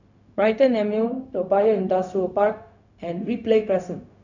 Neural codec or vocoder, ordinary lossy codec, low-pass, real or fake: codec, 16 kHz, 0.4 kbps, LongCat-Audio-Codec; none; 7.2 kHz; fake